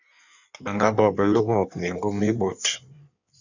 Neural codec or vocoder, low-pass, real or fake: codec, 16 kHz in and 24 kHz out, 1.1 kbps, FireRedTTS-2 codec; 7.2 kHz; fake